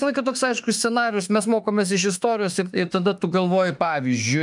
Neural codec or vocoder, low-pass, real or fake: autoencoder, 48 kHz, 32 numbers a frame, DAC-VAE, trained on Japanese speech; 10.8 kHz; fake